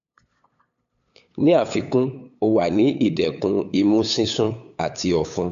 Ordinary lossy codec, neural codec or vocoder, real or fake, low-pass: AAC, 96 kbps; codec, 16 kHz, 8 kbps, FunCodec, trained on LibriTTS, 25 frames a second; fake; 7.2 kHz